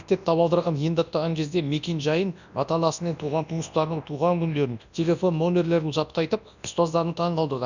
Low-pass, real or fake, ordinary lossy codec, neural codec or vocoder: 7.2 kHz; fake; none; codec, 24 kHz, 0.9 kbps, WavTokenizer, large speech release